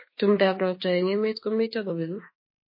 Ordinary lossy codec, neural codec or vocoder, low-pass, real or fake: MP3, 24 kbps; autoencoder, 48 kHz, 32 numbers a frame, DAC-VAE, trained on Japanese speech; 5.4 kHz; fake